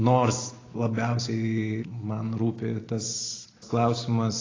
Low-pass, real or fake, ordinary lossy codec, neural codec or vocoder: 7.2 kHz; real; AAC, 32 kbps; none